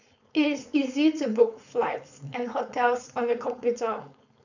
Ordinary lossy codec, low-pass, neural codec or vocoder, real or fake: none; 7.2 kHz; codec, 16 kHz, 4.8 kbps, FACodec; fake